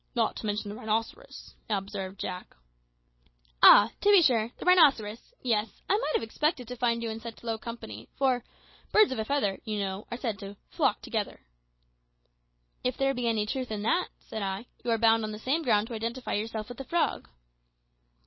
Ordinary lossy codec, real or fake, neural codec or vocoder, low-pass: MP3, 24 kbps; real; none; 7.2 kHz